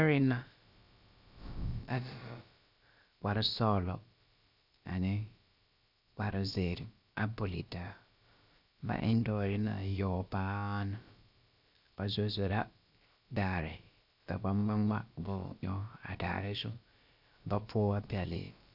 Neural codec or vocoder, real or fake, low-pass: codec, 16 kHz, about 1 kbps, DyCAST, with the encoder's durations; fake; 5.4 kHz